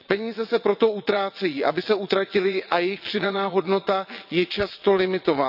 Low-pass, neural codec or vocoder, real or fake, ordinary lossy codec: 5.4 kHz; vocoder, 22.05 kHz, 80 mel bands, WaveNeXt; fake; none